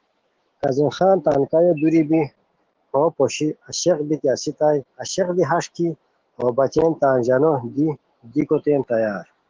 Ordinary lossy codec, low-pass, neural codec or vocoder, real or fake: Opus, 16 kbps; 7.2 kHz; autoencoder, 48 kHz, 128 numbers a frame, DAC-VAE, trained on Japanese speech; fake